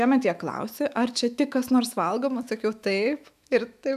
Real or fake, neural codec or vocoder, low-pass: fake; autoencoder, 48 kHz, 128 numbers a frame, DAC-VAE, trained on Japanese speech; 14.4 kHz